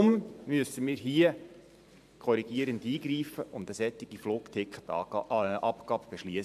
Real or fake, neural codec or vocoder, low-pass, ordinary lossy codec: fake; vocoder, 44.1 kHz, 128 mel bands every 512 samples, BigVGAN v2; 14.4 kHz; none